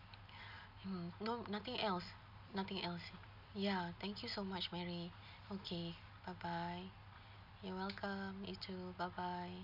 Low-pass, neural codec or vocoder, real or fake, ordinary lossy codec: 5.4 kHz; none; real; none